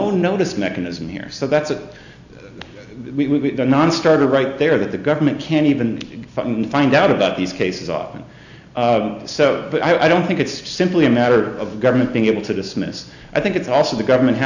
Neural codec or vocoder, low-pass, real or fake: none; 7.2 kHz; real